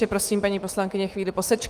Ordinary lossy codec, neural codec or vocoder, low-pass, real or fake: Opus, 24 kbps; autoencoder, 48 kHz, 128 numbers a frame, DAC-VAE, trained on Japanese speech; 14.4 kHz; fake